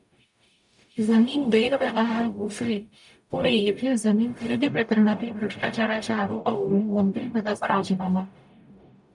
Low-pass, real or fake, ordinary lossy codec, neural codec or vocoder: 10.8 kHz; fake; MP3, 96 kbps; codec, 44.1 kHz, 0.9 kbps, DAC